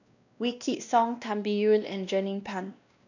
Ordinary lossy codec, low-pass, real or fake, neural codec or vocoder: none; 7.2 kHz; fake; codec, 16 kHz, 1 kbps, X-Codec, WavLM features, trained on Multilingual LibriSpeech